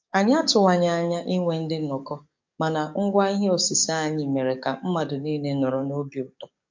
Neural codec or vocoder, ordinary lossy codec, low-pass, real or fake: codec, 44.1 kHz, 7.8 kbps, DAC; MP3, 48 kbps; 7.2 kHz; fake